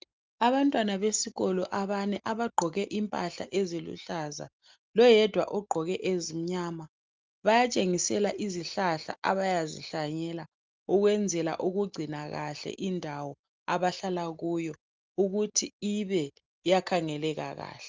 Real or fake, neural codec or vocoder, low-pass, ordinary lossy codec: real; none; 7.2 kHz; Opus, 32 kbps